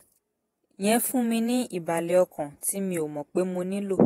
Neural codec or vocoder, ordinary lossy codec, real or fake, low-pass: vocoder, 48 kHz, 128 mel bands, Vocos; AAC, 48 kbps; fake; 19.8 kHz